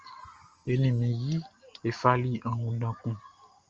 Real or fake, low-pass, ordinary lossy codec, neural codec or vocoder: real; 7.2 kHz; Opus, 24 kbps; none